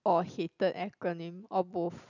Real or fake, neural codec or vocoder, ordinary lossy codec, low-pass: real; none; none; 7.2 kHz